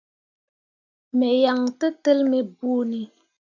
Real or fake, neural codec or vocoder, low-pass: real; none; 7.2 kHz